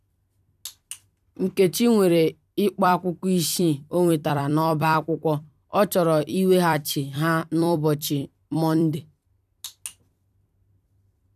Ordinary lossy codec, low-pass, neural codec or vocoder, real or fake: none; 14.4 kHz; vocoder, 44.1 kHz, 128 mel bands every 256 samples, BigVGAN v2; fake